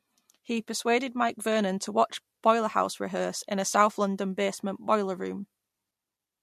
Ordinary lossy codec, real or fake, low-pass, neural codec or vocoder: MP3, 64 kbps; real; 14.4 kHz; none